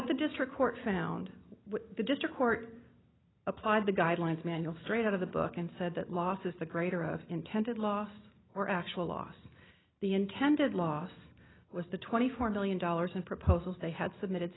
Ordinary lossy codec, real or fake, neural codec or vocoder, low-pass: AAC, 16 kbps; real; none; 7.2 kHz